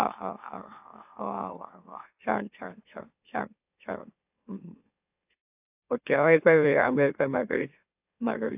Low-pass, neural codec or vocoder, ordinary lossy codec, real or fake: 3.6 kHz; autoencoder, 44.1 kHz, a latent of 192 numbers a frame, MeloTTS; AAC, 32 kbps; fake